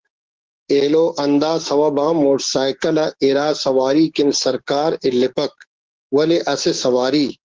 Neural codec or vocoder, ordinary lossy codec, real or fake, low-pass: codec, 16 kHz, 6 kbps, DAC; Opus, 16 kbps; fake; 7.2 kHz